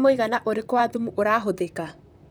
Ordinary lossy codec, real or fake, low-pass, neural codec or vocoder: none; fake; none; vocoder, 44.1 kHz, 128 mel bands, Pupu-Vocoder